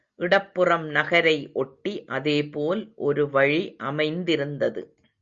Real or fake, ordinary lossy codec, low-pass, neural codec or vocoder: real; Opus, 64 kbps; 7.2 kHz; none